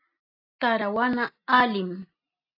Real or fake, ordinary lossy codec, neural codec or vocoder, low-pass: real; AAC, 32 kbps; none; 5.4 kHz